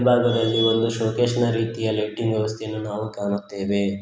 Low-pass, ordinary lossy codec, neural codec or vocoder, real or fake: none; none; none; real